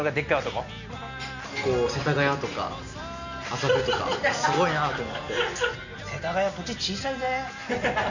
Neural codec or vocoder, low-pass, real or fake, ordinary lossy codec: none; 7.2 kHz; real; none